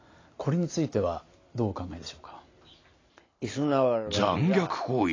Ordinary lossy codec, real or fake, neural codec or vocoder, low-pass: AAC, 32 kbps; real; none; 7.2 kHz